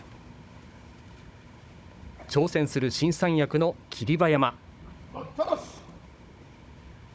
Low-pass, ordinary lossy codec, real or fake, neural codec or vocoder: none; none; fake; codec, 16 kHz, 16 kbps, FunCodec, trained on Chinese and English, 50 frames a second